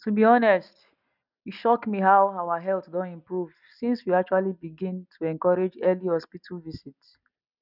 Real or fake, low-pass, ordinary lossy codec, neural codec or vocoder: real; 5.4 kHz; none; none